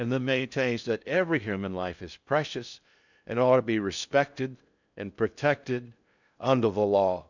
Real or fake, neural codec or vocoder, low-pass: fake; codec, 16 kHz in and 24 kHz out, 0.6 kbps, FocalCodec, streaming, 2048 codes; 7.2 kHz